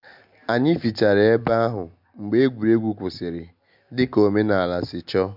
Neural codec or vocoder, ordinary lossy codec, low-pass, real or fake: none; MP3, 48 kbps; 5.4 kHz; real